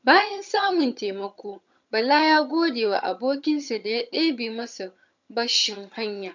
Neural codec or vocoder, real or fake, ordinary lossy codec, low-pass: vocoder, 22.05 kHz, 80 mel bands, HiFi-GAN; fake; MP3, 64 kbps; 7.2 kHz